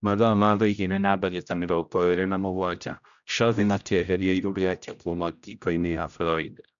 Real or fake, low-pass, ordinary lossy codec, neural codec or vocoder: fake; 7.2 kHz; MP3, 96 kbps; codec, 16 kHz, 0.5 kbps, X-Codec, HuBERT features, trained on general audio